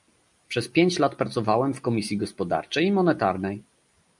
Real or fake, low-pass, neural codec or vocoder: real; 10.8 kHz; none